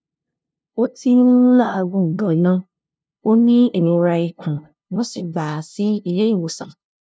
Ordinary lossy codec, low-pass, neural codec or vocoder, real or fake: none; none; codec, 16 kHz, 0.5 kbps, FunCodec, trained on LibriTTS, 25 frames a second; fake